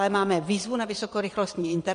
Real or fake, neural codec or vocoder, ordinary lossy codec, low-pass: fake; vocoder, 22.05 kHz, 80 mel bands, Vocos; MP3, 48 kbps; 9.9 kHz